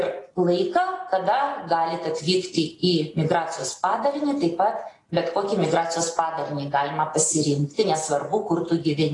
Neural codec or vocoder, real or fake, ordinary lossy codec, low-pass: none; real; AAC, 32 kbps; 10.8 kHz